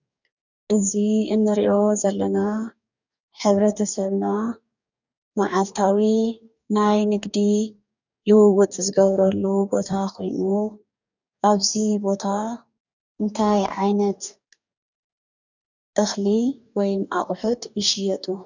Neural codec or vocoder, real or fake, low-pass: codec, 44.1 kHz, 2.6 kbps, DAC; fake; 7.2 kHz